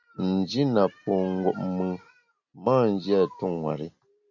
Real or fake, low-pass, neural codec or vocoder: real; 7.2 kHz; none